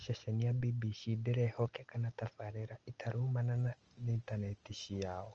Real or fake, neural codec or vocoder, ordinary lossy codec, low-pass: real; none; Opus, 24 kbps; 7.2 kHz